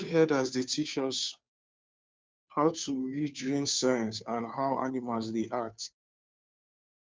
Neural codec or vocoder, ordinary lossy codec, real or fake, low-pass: codec, 16 kHz, 2 kbps, FunCodec, trained on Chinese and English, 25 frames a second; none; fake; none